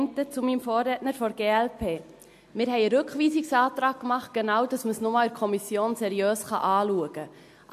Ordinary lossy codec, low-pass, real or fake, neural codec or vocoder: MP3, 64 kbps; 14.4 kHz; real; none